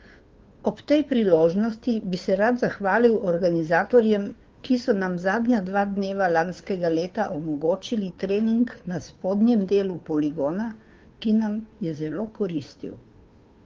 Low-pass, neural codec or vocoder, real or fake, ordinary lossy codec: 7.2 kHz; codec, 16 kHz, 2 kbps, FunCodec, trained on Chinese and English, 25 frames a second; fake; Opus, 32 kbps